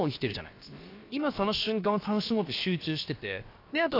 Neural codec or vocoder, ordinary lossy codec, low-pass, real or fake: codec, 16 kHz, about 1 kbps, DyCAST, with the encoder's durations; AAC, 32 kbps; 5.4 kHz; fake